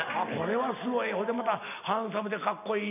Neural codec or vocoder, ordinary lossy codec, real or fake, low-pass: none; none; real; 3.6 kHz